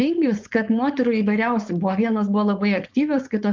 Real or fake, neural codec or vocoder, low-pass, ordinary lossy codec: fake; codec, 16 kHz, 4.8 kbps, FACodec; 7.2 kHz; Opus, 32 kbps